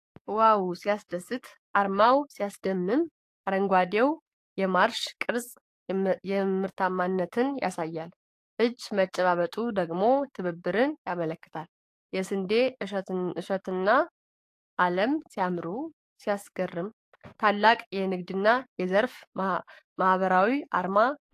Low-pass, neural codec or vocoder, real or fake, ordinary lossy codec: 14.4 kHz; codec, 44.1 kHz, 7.8 kbps, DAC; fake; AAC, 64 kbps